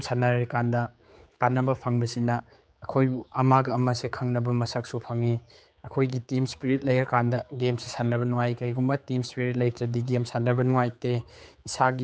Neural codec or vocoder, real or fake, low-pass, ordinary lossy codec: codec, 16 kHz, 4 kbps, X-Codec, HuBERT features, trained on general audio; fake; none; none